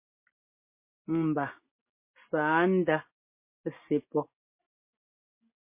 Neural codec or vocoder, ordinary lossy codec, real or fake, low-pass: none; MP3, 24 kbps; real; 3.6 kHz